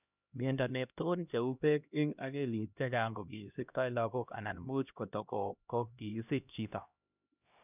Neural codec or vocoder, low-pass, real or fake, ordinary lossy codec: codec, 16 kHz, 1 kbps, X-Codec, HuBERT features, trained on LibriSpeech; 3.6 kHz; fake; none